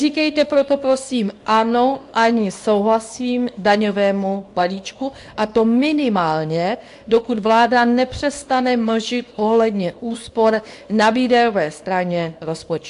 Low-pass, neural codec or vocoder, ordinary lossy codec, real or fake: 10.8 kHz; codec, 24 kHz, 0.9 kbps, WavTokenizer, small release; AAC, 64 kbps; fake